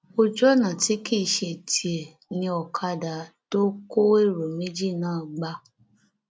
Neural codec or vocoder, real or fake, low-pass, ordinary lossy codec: none; real; none; none